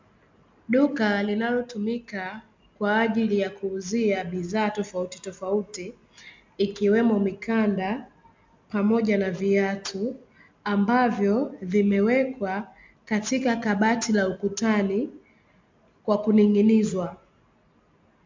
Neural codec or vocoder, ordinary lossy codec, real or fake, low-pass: none; MP3, 64 kbps; real; 7.2 kHz